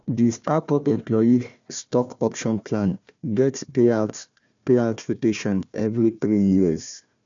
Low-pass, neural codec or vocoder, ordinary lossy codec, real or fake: 7.2 kHz; codec, 16 kHz, 1 kbps, FunCodec, trained on Chinese and English, 50 frames a second; MP3, 64 kbps; fake